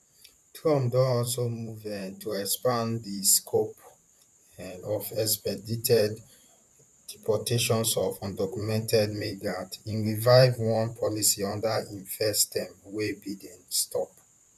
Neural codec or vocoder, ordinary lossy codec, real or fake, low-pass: vocoder, 44.1 kHz, 128 mel bands, Pupu-Vocoder; none; fake; 14.4 kHz